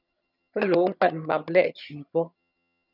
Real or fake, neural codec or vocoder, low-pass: fake; vocoder, 22.05 kHz, 80 mel bands, HiFi-GAN; 5.4 kHz